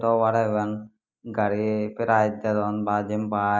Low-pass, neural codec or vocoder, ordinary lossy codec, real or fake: 7.2 kHz; none; none; real